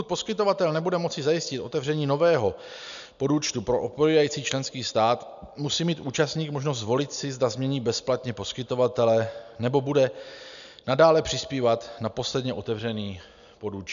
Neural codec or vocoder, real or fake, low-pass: none; real; 7.2 kHz